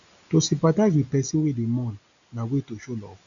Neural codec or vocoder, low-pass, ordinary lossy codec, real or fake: none; 7.2 kHz; none; real